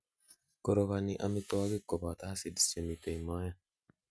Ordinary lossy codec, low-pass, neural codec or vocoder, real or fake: none; none; none; real